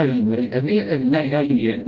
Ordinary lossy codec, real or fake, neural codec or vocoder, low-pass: Opus, 32 kbps; fake; codec, 16 kHz, 0.5 kbps, FreqCodec, smaller model; 7.2 kHz